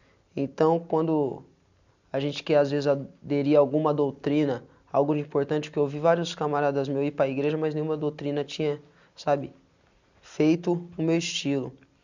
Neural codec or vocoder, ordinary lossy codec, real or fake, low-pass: none; none; real; 7.2 kHz